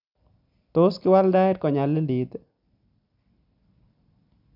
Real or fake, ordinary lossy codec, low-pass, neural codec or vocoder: real; none; 5.4 kHz; none